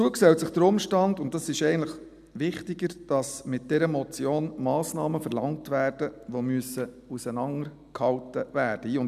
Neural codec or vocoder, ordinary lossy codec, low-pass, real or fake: none; none; 14.4 kHz; real